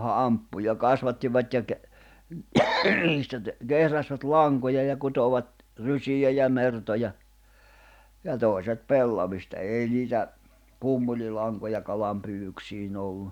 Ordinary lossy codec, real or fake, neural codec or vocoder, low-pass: none; real; none; 19.8 kHz